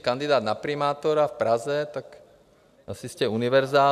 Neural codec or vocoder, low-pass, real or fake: none; 14.4 kHz; real